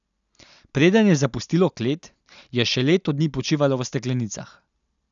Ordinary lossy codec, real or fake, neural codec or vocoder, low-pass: none; real; none; 7.2 kHz